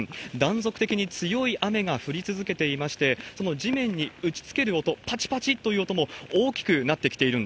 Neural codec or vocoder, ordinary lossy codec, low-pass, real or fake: none; none; none; real